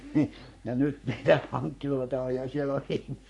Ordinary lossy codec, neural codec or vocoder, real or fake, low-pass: none; codec, 44.1 kHz, 3.4 kbps, Pupu-Codec; fake; 10.8 kHz